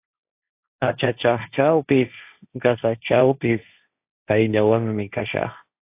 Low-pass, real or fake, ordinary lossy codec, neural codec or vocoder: 3.6 kHz; fake; AAC, 32 kbps; codec, 16 kHz, 1.1 kbps, Voila-Tokenizer